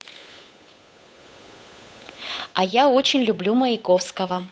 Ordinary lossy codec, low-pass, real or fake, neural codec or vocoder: none; none; fake; codec, 16 kHz, 8 kbps, FunCodec, trained on Chinese and English, 25 frames a second